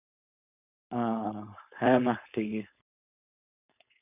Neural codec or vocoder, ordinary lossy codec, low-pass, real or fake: codec, 16 kHz, 4.8 kbps, FACodec; none; 3.6 kHz; fake